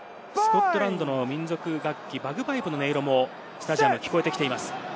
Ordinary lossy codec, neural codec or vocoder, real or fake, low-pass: none; none; real; none